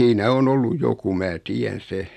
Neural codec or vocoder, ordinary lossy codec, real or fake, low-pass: none; none; real; 14.4 kHz